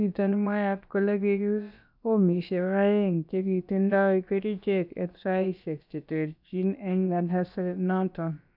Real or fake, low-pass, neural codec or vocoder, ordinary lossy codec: fake; 5.4 kHz; codec, 16 kHz, about 1 kbps, DyCAST, with the encoder's durations; none